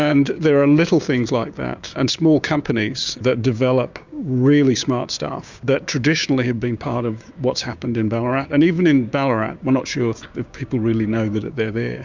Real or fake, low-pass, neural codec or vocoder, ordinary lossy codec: fake; 7.2 kHz; codec, 16 kHz, 6 kbps, DAC; Opus, 64 kbps